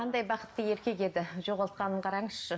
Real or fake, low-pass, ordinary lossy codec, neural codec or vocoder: real; none; none; none